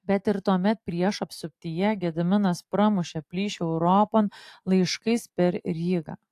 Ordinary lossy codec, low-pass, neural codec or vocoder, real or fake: AAC, 64 kbps; 14.4 kHz; none; real